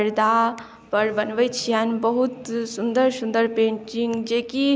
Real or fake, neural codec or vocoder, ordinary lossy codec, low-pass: real; none; none; none